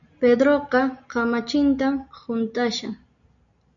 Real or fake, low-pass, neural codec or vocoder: real; 7.2 kHz; none